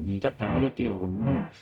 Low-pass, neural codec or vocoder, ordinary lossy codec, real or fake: 19.8 kHz; codec, 44.1 kHz, 0.9 kbps, DAC; none; fake